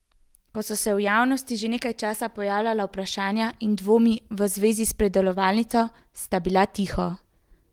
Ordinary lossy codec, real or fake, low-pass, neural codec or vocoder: Opus, 24 kbps; real; 19.8 kHz; none